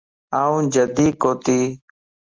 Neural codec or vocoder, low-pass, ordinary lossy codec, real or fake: none; 7.2 kHz; Opus, 24 kbps; real